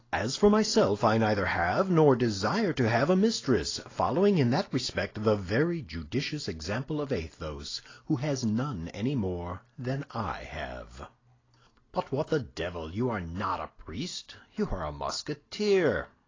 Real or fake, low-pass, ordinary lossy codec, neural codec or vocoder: real; 7.2 kHz; AAC, 32 kbps; none